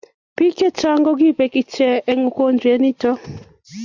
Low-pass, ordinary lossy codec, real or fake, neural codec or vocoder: 7.2 kHz; Opus, 64 kbps; real; none